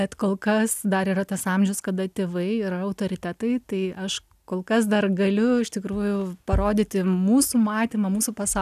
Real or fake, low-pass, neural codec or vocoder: real; 14.4 kHz; none